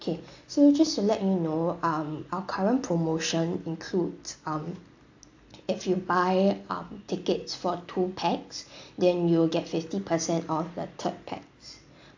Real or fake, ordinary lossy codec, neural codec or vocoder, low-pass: real; AAC, 48 kbps; none; 7.2 kHz